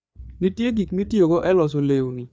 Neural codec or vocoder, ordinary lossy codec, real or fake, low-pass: codec, 16 kHz, 4 kbps, FreqCodec, larger model; none; fake; none